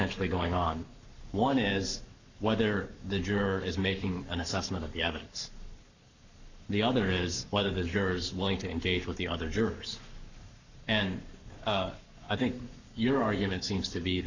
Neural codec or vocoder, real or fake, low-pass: codec, 44.1 kHz, 7.8 kbps, Pupu-Codec; fake; 7.2 kHz